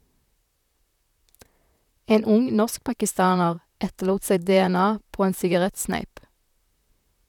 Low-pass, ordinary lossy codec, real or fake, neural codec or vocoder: 19.8 kHz; none; fake; vocoder, 44.1 kHz, 128 mel bands, Pupu-Vocoder